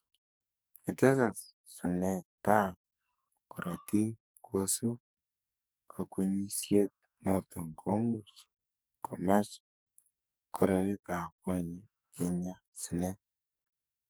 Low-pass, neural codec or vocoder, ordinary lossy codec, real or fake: none; codec, 44.1 kHz, 2.6 kbps, SNAC; none; fake